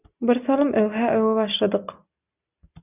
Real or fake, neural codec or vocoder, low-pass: real; none; 3.6 kHz